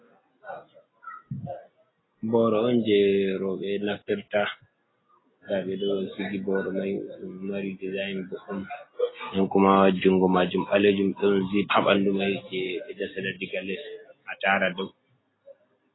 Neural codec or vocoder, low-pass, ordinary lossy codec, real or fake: none; 7.2 kHz; AAC, 16 kbps; real